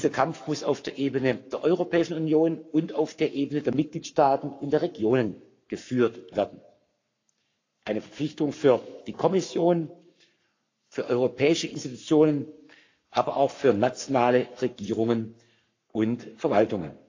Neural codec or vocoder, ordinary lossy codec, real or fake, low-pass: codec, 44.1 kHz, 7.8 kbps, Pupu-Codec; AAC, 48 kbps; fake; 7.2 kHz